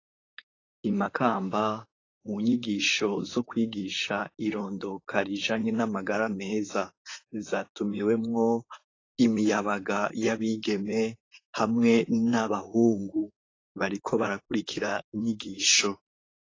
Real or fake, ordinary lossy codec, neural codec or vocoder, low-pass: fake; AAC, 32 kbps; vocoder, 44.1 kHz, 128 mel bands, Pupu-Vocoder; 7.2 kHz